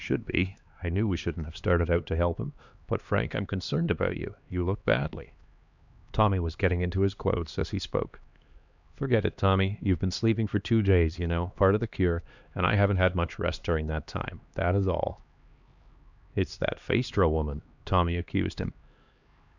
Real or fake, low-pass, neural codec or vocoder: fake; 7.2 kHz; codec, 16 kHz, 2 kbps, X-Codec, HuBERT features, trained on LibriSpeech